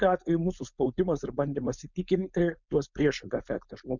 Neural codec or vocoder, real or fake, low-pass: codec, 16 kHz, 4.8 kbps, FACodec; fake; 7.2 kHz